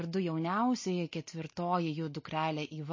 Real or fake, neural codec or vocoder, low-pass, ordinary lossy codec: real; none; 7.2 kHz; MP3, 32 kbps